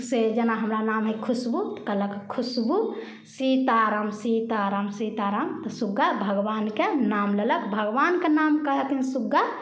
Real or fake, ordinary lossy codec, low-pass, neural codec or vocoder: real; none; none; none